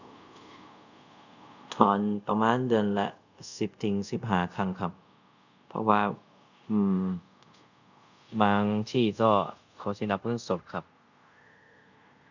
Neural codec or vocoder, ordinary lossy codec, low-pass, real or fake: codec, 24 kHz, 0.5 kbps, DualCodec; none; 7.2 kHz; fake